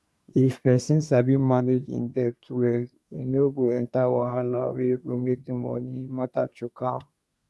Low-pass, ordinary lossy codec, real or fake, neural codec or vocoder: none; none; fake; codec, 24 kHz, 1 kbps, SNAC